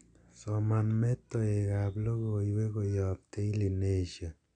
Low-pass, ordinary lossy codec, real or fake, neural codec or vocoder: 9.9 kHz; none; real; none